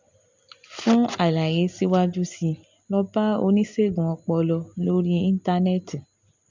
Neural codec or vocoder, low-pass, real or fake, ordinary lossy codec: none; 7.2 kHz; real; MP3, 64 kbps